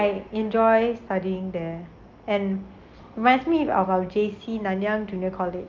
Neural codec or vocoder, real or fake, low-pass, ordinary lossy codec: none; real; 7.2 kHz; Opus, 24 kbps